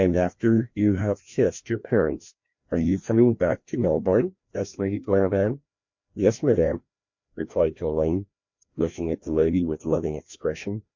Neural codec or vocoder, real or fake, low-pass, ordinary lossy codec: codec, 16 kHz, 1 kbps, FreqCodec, larger model; fake; 7.2 kHz; MP3, 48 kbps